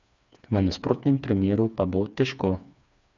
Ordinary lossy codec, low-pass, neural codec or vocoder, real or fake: none; 7.2 kHz; codec, 16 kHz, 4 kbps, FreqCodec, smaller model; fake